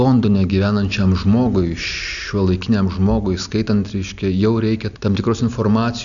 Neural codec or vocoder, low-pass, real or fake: none; 7.2 kHz; real